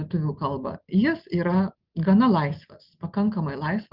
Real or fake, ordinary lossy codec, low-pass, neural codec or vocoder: real; Opus, 32 kbps; 5.4 kHz; none